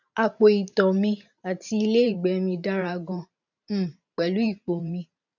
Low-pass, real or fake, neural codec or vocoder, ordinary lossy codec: 7.2 kHz; fake; vocoder, 44.1 kHz, 128 mel bands every 512 samples, BigVGAN v2; none